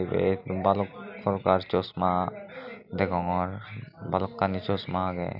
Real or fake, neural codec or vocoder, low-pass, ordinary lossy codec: real; none; 5.4 kHz; none